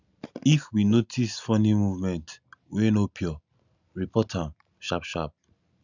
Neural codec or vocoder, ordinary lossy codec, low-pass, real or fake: none; none; 7.2 kHz; real